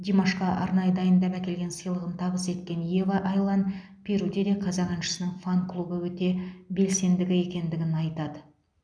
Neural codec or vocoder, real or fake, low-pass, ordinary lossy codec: none; real; 9.9 kHz; none